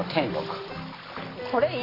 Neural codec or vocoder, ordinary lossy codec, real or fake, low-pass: none; AAC, 24 kbps; real; 5.4 kHz